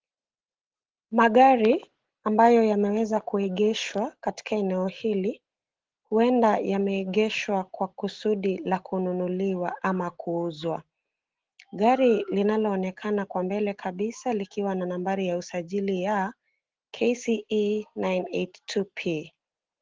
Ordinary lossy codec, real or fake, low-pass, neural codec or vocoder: Opus, 16 kbps; real; 7.2 kHz; none